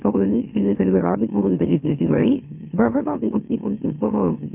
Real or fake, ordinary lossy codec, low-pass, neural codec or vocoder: fake; none; 3.6 kHz; autoencoder, 44.1 kHz, a latent of 192 numbers a frame, MeloTTS